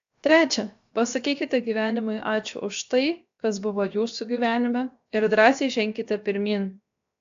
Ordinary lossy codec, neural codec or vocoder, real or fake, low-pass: AAC, 64 kbps; codec, 16 kHz, 0.7 kbps, FocalCodec; fake; 7.2 kHz